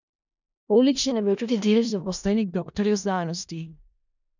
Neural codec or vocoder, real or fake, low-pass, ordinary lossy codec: codec, 16 kHz in and 24 kHz out, 0.4 kbps, LongCat-Audio-Codec, four codebook decoder; fake; 7.2 kHz; none